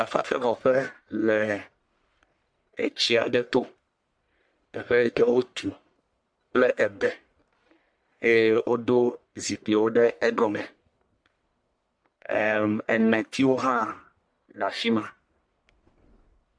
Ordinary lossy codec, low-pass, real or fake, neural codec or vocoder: MP3, 64 kbps; 9.9 kHz; fake; codec, 44.1 kHz, 1.7 kbps, Pupu-Codec